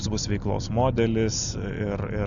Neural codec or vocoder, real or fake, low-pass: none; real; 7.2 kHz